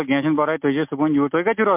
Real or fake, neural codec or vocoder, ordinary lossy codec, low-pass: real; none; MP3, 32 kbps; 3.6 kHz